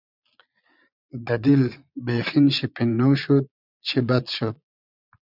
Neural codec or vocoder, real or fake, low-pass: vocoder, 22.05 kHz, 80 mel bands, Vocos; fake; 5.4 kHz